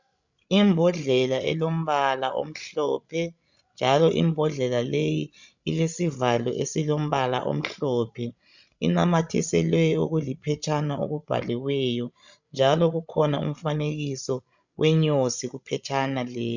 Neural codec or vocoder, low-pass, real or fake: codec, 16 kHz, 16 kbps, FreqCodec, larger model; 7.2 kHz; fake